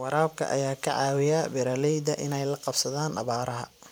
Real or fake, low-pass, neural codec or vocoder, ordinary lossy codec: fake; none; vocoder, 44.1 kHz, 128 mel bands every 512 samples, BigVGAN v2; none